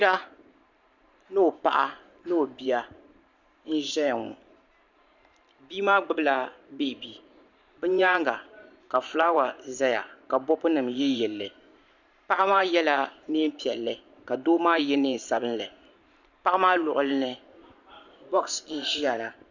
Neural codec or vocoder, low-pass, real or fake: vocoder, 44.1 kHz, 128 mel bands every 512 samples, BigVGAN v2; 7.2 kHz; fake